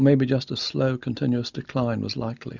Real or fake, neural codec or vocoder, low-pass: real; none; 7.2 kHz